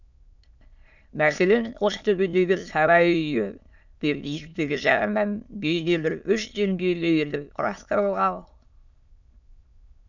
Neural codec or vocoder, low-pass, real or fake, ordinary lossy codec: autoencoder, 22.05 kHz, a latent of 192 numbers a frame, VITS, trained on many speakers; 7.2 kHz; fake; none